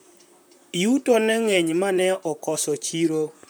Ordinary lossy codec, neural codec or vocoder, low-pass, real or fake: none; vocoder, 44.1 kHz, 128 mel bands, Pupu-Vocoder; none; fake